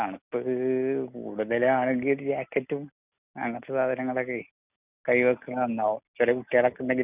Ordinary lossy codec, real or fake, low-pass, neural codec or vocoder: none; real; 3.6 kHz; none